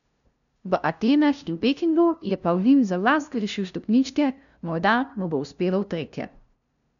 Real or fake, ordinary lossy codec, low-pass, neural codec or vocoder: fake; none; 7.2 kHz; codec, 16 kHz, 0.5 kbps, FunCodec, trained on LibriTTS, 25 frames a second